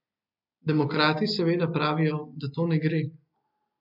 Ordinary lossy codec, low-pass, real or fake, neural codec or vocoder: MP3, 48 kbps; 5.4 kHz; real; none